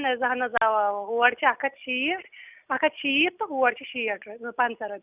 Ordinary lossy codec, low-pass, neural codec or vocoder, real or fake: none; 3.6 kHz; none; real